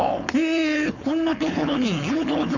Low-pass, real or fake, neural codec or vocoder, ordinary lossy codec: 7.2 kHz; fake; codec, 16 kHz, 4.8 kbps, FACodec; none